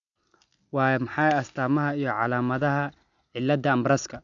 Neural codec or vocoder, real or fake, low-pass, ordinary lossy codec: none; real; 7.2 kHz; MP3, 96 kbps